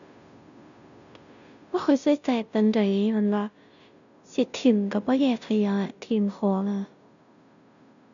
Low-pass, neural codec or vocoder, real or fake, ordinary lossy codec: 7.2 kHz; codec, 16 kHz, 0.5 kbps, FunCodec, trained on Chinese and English, 25 frames a second; fake; none